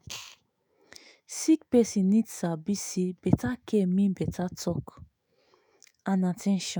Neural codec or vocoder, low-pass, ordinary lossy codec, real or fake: autoencoder, 48 kHz, 128 numbers a frame, DAC-VAE, trained on Japanese speech; none; none; fake